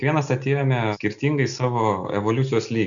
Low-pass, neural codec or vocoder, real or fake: 7.2 kHz; none; real